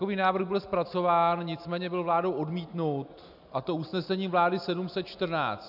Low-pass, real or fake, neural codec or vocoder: 5.4 kHz; real; none